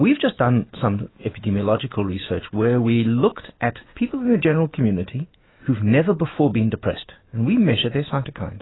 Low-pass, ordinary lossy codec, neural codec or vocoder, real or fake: 7.2 kHz; AAC, 16 kbps; autoencoder, 48 kHz, 128 numbers a frame, DAC-VAE, trained on Japanese speech; fake